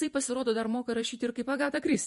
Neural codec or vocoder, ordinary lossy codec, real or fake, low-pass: vocoder, 48 kHz, 128 mel bands, Vocos; MP3, 48 kbps; fake; 14.4 kHz